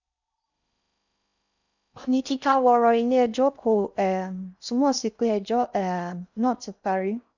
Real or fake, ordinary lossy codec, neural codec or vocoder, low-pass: fake; none; codec, 16 kHz in and 24 kHz out, 0.6 kbps, FocalCodec, streaming, 4096 codes; 7.2 kHz